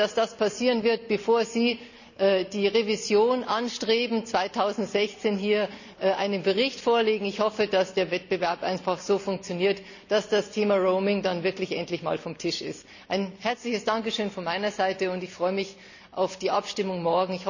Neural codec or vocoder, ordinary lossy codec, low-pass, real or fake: none; none; 7.2 kHz; real